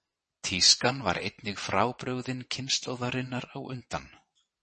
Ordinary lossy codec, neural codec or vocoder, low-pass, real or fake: MP3, 32 kbps; vocoder, 22.05 kHz, 80 mel bands, WaveNeXt; 9.9 kHz; fake